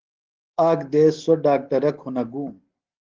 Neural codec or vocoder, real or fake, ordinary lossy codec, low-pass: none; real; Opus, 16 kbps; 7.2 kHz